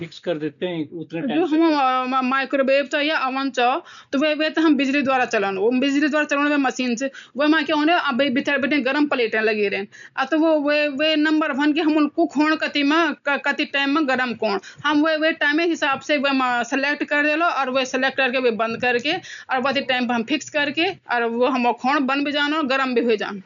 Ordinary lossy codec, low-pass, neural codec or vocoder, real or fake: none; 7.2 kHz; none; real